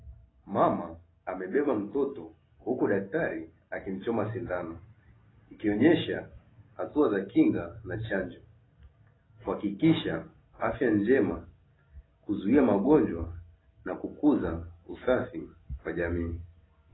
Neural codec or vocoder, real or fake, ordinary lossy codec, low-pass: none; real; AAC, 16 kbps; 7.2 kHz